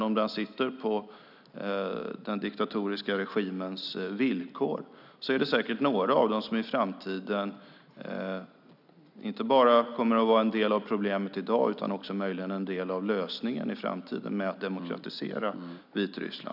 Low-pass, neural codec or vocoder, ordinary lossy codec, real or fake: 5.4 kHz; none; none; real